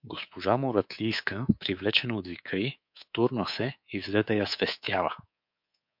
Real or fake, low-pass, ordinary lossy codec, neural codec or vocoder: fake; 5.4 kHz; MP3, 48 kbps; codec, 24 kHz, 3.1 kbps, DualCodec